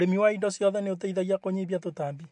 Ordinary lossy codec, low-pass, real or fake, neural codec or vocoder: MP3, 64 kbps; 10.8 kHz; real; none